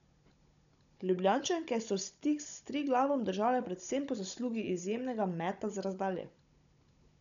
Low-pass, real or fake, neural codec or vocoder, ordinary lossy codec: 7.2 kHz; fake; codec, 16 kHz, 16 kbps, FunCodec, trained on Chinese and English, 50 frames a second; none